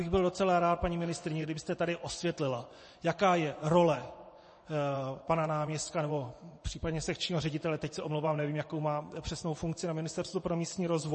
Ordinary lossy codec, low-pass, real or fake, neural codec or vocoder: MP3, 32 kbps; 10.8 kHz; fake; vocoder, 24 kHz, 100 mel bands, Vocos